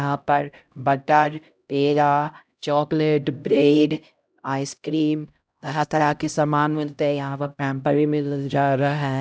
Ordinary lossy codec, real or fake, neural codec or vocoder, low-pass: none; fake; codec, 16 kHz, 0.5 kbps, X-Codec, HuBERT features, trained on LibriSpeech; none